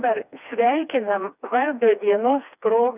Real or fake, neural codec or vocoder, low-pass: fake; codec, 16 kHz, 2 kbps, FreqCodec, smaller model; 3.6 kHz